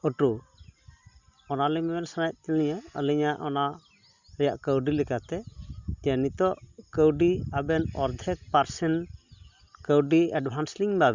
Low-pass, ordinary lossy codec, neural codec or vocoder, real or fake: 7.2 kHz; Opus, 64 kbps; none; real